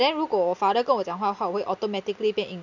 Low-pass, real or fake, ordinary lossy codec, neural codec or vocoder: 7.2 kHz; real; none; none